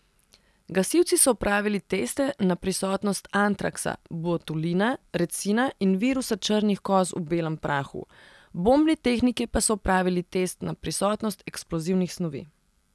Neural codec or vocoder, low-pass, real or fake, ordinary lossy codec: none; none; real; none